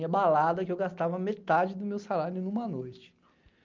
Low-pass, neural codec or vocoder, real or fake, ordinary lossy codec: 7.2 kHz; none; real; Opus, 24 kbps